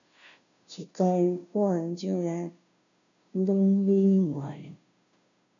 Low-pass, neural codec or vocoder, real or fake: 7.2 kHz; codec, 16 kHz, 0.5 kbps, FunCodec, trained on Chinese and English, 25 frames a second; fake